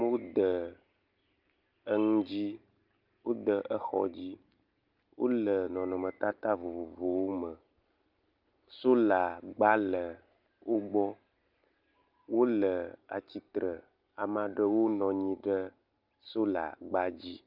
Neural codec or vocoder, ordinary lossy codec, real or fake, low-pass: none; Opus, 24 kbps; real; 5.4 kHz